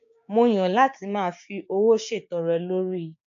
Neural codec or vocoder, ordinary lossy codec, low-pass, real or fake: codec, 16 kHz, 6 kbps, DAC; AAC, 64 kbps; 7.2 kHz; fake